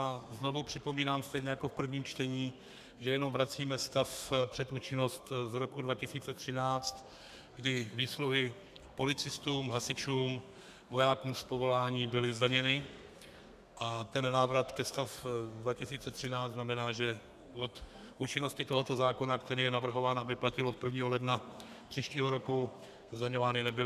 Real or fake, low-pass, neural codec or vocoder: fake; 14.4 kHz; codec, 32 kHz, 1.9 kbps, SNAC